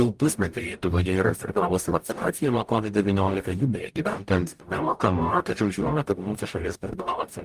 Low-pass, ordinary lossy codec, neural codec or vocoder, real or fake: 14.4 kHz; Opus, 32 kbps; codec, 44.1 kHz, 0.9 kbps, DAC; fake